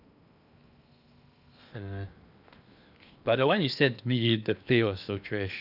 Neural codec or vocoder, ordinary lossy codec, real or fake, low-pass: codec, 16 kHz in and 24 kHz out, 0.8 kbps, FocalCodec, streaming, 65536 codes; none; fake; 5.4 kHz